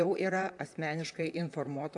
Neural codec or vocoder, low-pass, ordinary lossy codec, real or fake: vocoder, 44.1 kHz, 128 mel bands, Pupu-Vocoder; 10.8 kHz; AAC, 64 kbps; fake